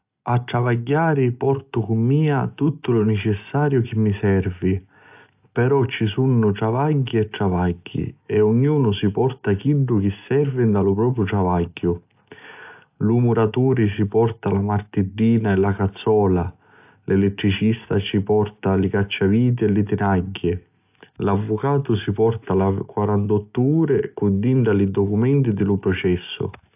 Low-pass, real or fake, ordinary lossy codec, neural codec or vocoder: 3.6 kHz; real; AAC, 32 kbps; none